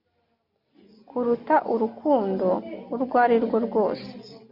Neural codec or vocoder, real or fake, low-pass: none; real; 5.4 kHz